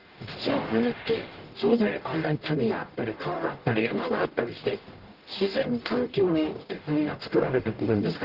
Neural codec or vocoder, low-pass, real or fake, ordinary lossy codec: codec, 44.1 kHz, 0.9 kbps, DAC; 5.4 kHz; fake; Opus, 16 kbps